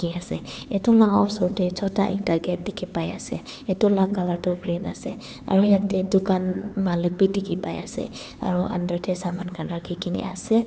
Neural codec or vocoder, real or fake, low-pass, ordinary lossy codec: codec, 16 kHz, 4 kbps, X-Codec, HuBERT features, trained on LibriSpeech; fake; none; none